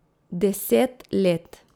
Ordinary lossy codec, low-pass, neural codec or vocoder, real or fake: none; none; none; real